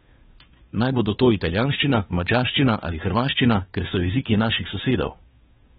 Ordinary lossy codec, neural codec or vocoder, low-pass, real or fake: AAC, 16 kbps; codec, 16 kHz, 2 kbps, FunCodec, trained on Chinese and English, 25 frames a second; 7.2 kHz; fake